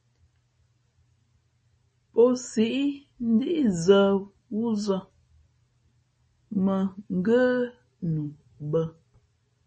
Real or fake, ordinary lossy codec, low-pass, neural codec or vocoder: real; MP3, 32 kbps; 10.8 kHz; none